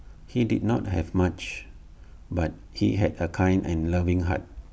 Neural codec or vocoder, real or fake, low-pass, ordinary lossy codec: none; real; none; none